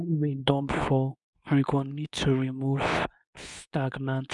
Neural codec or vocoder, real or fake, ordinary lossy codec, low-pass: codec, 24 kHz, 0.9 kbps, WavTokenizer, medium speech release version 2; fake; none; 10.8 kHz